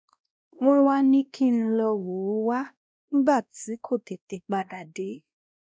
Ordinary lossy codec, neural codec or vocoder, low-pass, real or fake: none; codec, 16 kHz, 1 kbps, X-Codec, WavLM features, trained on Multilingual LibriSpeech; none; fake